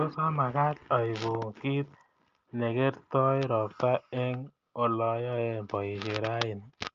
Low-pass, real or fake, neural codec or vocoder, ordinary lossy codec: 7.2 kHz; real; none; Opus, 24 kbps